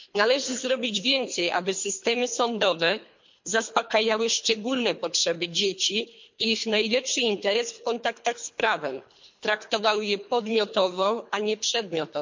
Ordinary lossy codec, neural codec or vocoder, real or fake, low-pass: MP3, 48 kbps; codec, 24 kHz, 3 kbps, HILCodec; fake; 7.2 kHz